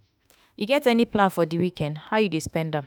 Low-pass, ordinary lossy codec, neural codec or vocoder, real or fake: none; none; autoencoder, 48 kHz, 32 numbers a frame, DAC-VAE, trained on Japanese speech; fake